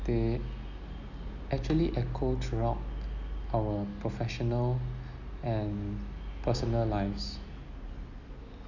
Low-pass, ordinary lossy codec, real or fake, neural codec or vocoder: 7.2 kHz; none; real; none